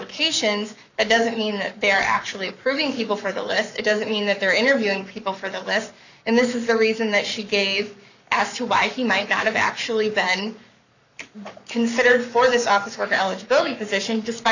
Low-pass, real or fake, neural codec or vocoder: 7.2 kHz; fake; codec, 44.1 kHz, 7.8 kbps, Pupu-Codec